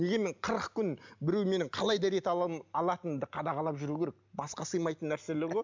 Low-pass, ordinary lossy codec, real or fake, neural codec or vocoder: 7.2 kHz; MP3, 64 kbps; real; none